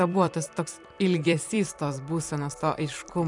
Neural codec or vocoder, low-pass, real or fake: vocoder, 44.1 kHz, 128 mel bands every 256 samples, BigVGAN v2; 10.8 kHz; fake